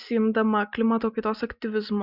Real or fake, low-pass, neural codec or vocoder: real; 5.4 kHz; none